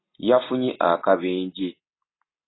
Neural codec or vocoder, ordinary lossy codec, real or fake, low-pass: none; AAC, 16 kbps; real; 7.2 kHz